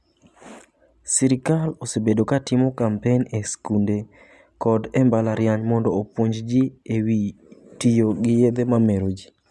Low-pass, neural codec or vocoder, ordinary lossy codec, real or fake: none; none; none; real